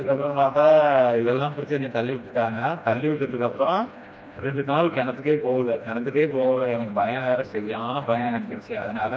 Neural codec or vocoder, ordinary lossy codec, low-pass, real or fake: codec, 16 kHz, 1 kbps, FreqCodec, smaller model; none; none; fake